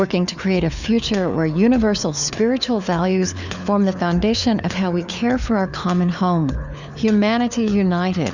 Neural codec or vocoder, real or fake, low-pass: codec, 16 kHz, 4 kbps, FunCodec, trained on LibriTTS, 50 frames a second; fake; 7.2 kHz